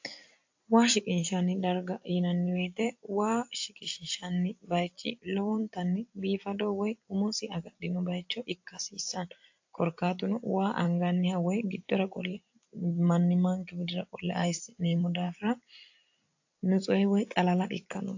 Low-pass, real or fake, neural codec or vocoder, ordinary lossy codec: 7.2 kHz; fake; vocoder, 44.1 kHz, 128 mel bands every 256 samples, BigVGAN v2; AAC, 48 kbps